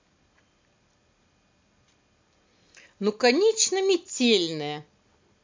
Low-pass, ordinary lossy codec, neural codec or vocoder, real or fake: 7.2 kHz; MP3, 64 kbps; none; real